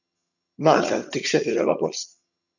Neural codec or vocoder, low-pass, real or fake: vocoder, 22.05 kHz, 80 mel bands, HiFi-GAN; 7.2 kHz; fake